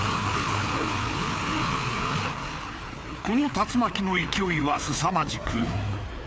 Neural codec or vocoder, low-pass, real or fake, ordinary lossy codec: codec, 16 kHz, 4 kbps, FreqCodec, larger model; none; fake; none